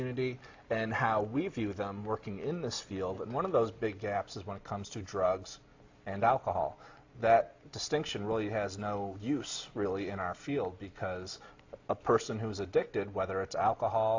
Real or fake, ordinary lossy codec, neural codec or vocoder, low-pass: real; AAC, 48 kbps; none; 7.2 kHz